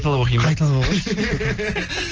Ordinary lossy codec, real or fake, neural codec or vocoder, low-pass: Opus, 16 kbps; real; none; 7.2 kHz